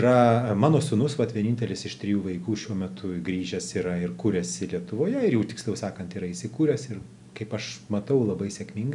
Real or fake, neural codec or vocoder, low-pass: real; none; 10.8 kHz